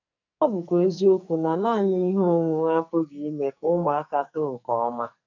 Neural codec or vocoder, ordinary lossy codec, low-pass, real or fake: codec, 44.1 kHz, 2.6 kbps, SNAC; none; 7.2 kHz; fake